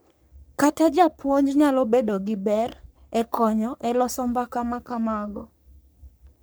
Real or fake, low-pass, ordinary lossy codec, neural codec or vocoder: fake; none; none; codec, 44.1 kHz, 3.4 kbps, Pupu-Codec